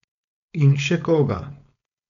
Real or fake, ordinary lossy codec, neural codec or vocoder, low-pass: fake; none; codec, 16 kHz, 4.8 kbps, FACodec; 7.2 kHz